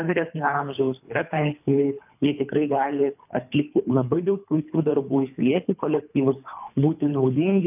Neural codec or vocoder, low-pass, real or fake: codec, 24 kHz, 3 kbps, HILCodec; 3.6 kHz; fake